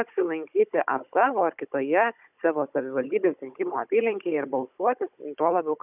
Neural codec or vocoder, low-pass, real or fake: codec, 16 kHz, 16 kbps, FunCodec, trained on Chinese and English, 50 frames a second; 3.6 kHz; fake